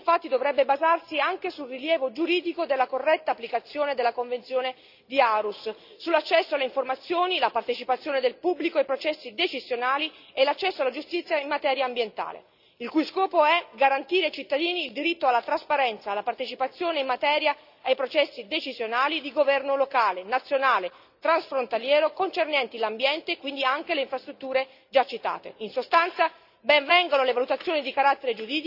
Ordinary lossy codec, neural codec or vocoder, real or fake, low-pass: none; none; real; 5.4 kHz